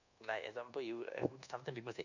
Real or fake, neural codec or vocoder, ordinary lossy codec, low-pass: fake; codec, 24 kHz, 1.2 kbps, DualCodec; none; 7.2 kHz